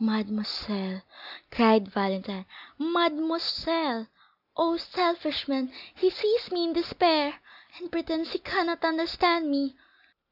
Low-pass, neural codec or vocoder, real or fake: 5.4 kHz; none; real